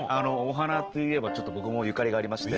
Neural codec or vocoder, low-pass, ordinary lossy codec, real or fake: none; 7.2 kHz; Opus, 16 kbps; real